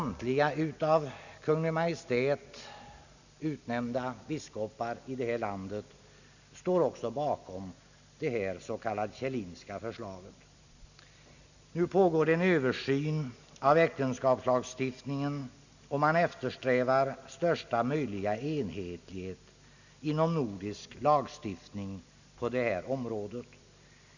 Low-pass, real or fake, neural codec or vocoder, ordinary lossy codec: 7.2 kHz; real; none; none